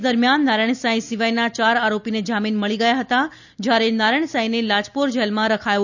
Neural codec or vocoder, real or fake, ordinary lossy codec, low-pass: none; real; none; 7.2 kHz